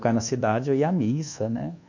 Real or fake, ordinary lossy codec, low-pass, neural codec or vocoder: fake; none; 7.2 kHz; codec, 24 kHz, 1.2 kbps, DualCodec